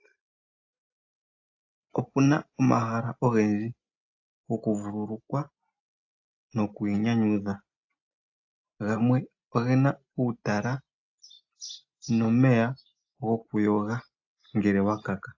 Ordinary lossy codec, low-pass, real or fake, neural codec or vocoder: AAC, 48 kbps; 7.2 kHz; real; none